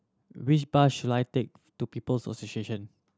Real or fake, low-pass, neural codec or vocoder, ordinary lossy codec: real; none; none; none